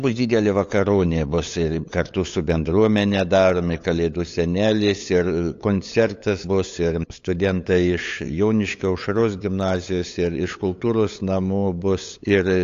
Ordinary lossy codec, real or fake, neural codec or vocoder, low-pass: AAC, 48 kbps; fake; codec, 16 kHz, 8 kbps, FunCodec, trained on LibriTTS, 25 frames a second; 7.2 kHz